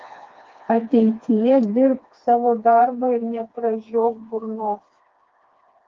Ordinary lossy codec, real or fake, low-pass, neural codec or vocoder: Opus, 32 kbps; fake; 7.2 kHz; codec, 16 kHz, 2 kbps, FreqCodec, smaller model